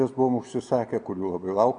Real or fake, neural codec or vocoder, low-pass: real; none; 9.9 kHz